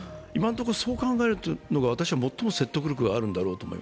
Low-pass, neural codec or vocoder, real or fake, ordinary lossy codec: none; none; real; none